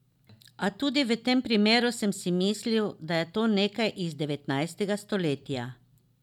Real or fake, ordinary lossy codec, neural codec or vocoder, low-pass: real; none; none; 19.8 kHz